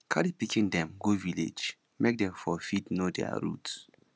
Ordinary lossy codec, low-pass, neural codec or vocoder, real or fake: none; none; none; real